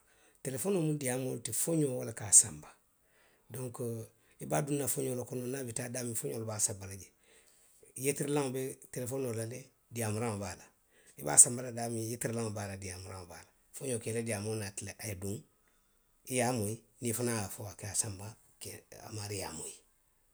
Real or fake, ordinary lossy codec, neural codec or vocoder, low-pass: real; none; none; none